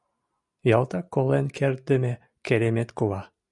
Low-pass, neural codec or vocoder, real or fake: 10.8 kHz; none; real